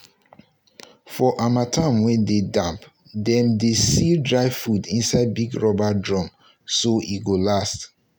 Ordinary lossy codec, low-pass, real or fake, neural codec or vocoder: none; none; real; none